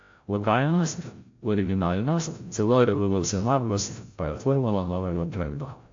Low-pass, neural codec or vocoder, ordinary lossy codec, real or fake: 7.2 kHz; codec, 16 kHz, 0.5 kbps, FreqCodec, larger model; AAC, 48 kbps; fake